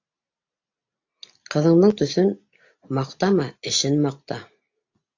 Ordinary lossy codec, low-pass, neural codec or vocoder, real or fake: AAC, 48 kbps; 7.2 kHz; none; real